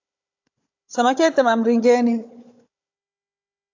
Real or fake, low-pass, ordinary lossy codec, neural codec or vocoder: fake; 7.2 kHz; AAC, 48 kbps; codec, 16 kHz, 16 kbps, FunCodec, trained on Chinese and English, 50 frames a second